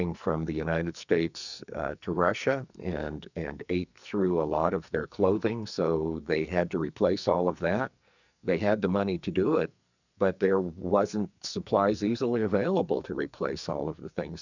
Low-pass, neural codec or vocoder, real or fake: 7.2 kHz; codec, 44.1 kHz, 2.6 kbps, SNAC; fake